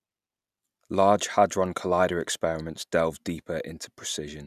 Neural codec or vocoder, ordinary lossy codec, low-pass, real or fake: none; none; 14.4 kHz; real